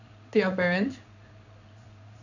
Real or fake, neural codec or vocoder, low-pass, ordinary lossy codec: fake; codec, 16 kHz in and 24 kHz out, 1 kbps, XY-Tokenizer; 7.2 kHz; none